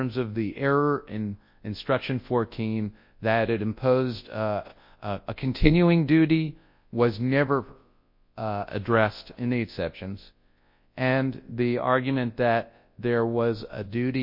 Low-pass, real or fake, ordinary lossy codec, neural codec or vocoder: 5.4 kHz; fake; MP3, 32 kbps; codec, 24 kHz, 0.9 kbps, WavTokenizer, large speech release